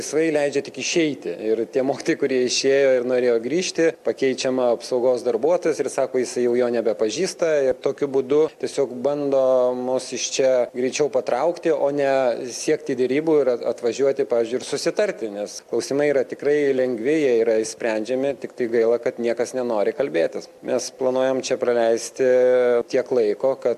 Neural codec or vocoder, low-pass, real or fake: none; 14.4 kHz; real